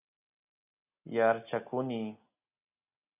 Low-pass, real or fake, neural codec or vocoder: 3.6 kHz; real; none